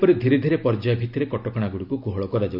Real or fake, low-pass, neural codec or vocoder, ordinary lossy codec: real; 5.4 kHz; none; MP3, 48 kbps